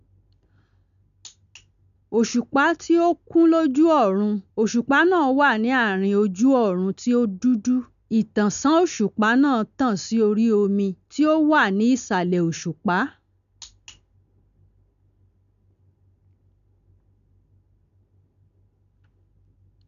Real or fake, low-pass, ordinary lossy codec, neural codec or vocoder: real; 7.2 kHz; none; none